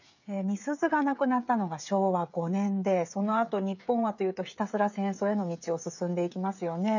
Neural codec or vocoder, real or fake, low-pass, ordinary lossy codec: codec, 16 kHz, 16 kbps, FreqCodec, smaller model; fake; 7.2 kHz; none